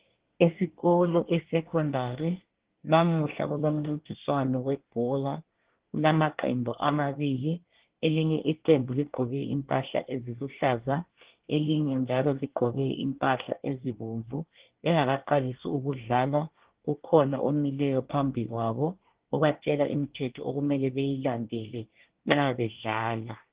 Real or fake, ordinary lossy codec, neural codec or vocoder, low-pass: fake; Opus, 16 kbps; codec, 24 kHz, 1 kbps, SNAC; 3.6 kHz